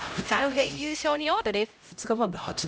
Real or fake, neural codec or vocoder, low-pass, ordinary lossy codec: fake; codec, 16 kHz, 0.5 kbps, X-Codec, HuBERT features, trained on LibriSpeech; none; none